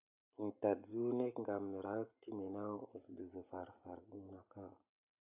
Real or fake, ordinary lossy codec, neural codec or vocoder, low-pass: real; AAC, 32 kbps; none; 3.6 kHz